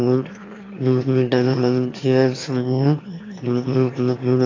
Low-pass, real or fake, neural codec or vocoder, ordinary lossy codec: 7.2 kHz; fake; autoencoder, 22.05 kHz, a latent of 192 numbers a frame, VITS, trained on one speaker; AAC, 32 kbps